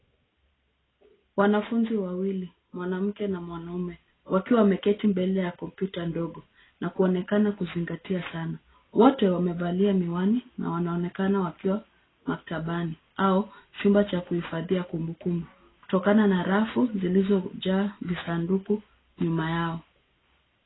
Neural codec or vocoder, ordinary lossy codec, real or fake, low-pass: none; AAC, 16 kbps; real; 7.2 kHz